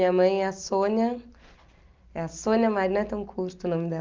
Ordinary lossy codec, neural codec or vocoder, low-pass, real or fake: Opus, 24 kbps; none; 7.2 kHz; real